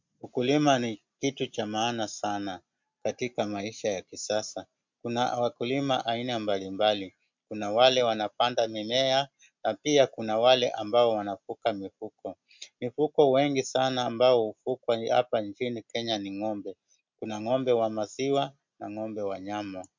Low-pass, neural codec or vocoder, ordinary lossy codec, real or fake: 7.2 kHz; none; MP3, 64 kbps; real